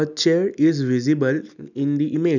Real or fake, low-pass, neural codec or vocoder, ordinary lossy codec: real; 7.2 kHz; none; none